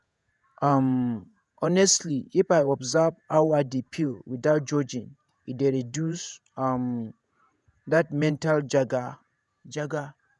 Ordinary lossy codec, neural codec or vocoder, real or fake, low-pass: none; vocoder, 48 kHz, 128 mel bands, Vocos; fake; 10.8 kHz